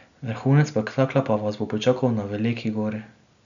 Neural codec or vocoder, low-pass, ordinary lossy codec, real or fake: none; 7.2 kHz; none; real